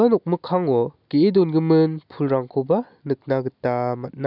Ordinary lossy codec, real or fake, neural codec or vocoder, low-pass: none; real; none; 5.4 kHz